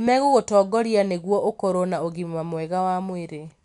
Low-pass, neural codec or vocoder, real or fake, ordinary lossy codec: 10.8 kHz; none; real; none